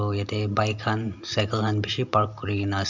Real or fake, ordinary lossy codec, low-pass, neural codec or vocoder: real; none; 7.2 kHz; none